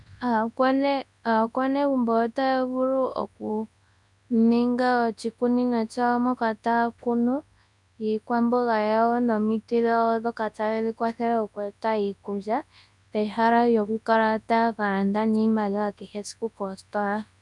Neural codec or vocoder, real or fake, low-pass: codec, 24 kHz, 0.9 kbps, WavTokenizer, large speech release; fake; 10.8 kHz